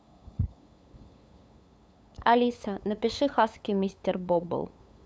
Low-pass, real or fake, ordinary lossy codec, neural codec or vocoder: none; fake; none; codec, 16 kHz, 8 kbps, FunCodec, trained on LibriTTS, 25 frames a second